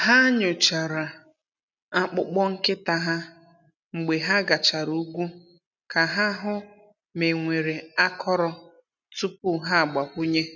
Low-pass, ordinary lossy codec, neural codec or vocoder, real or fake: 7.2 kHz; none; none; real